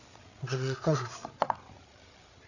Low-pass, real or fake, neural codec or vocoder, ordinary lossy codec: 7.2 kHz; fake; codec, 16 kHz, 8 kbps, FreqCodec, larger model; none